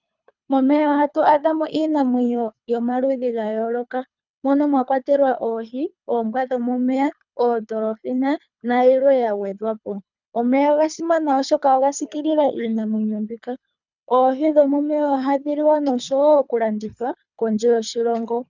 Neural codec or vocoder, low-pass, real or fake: codec, 24 kHz, 3 kbps, HILCodec; 7.2 kHz; fake